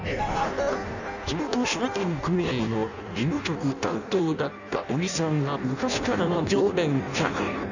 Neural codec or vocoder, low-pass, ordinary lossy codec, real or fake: codec, 16 kHz in and 24 kHz out, 0.6 kbps, FireRedTTS-2 codec; 7.2 kHz; none; fake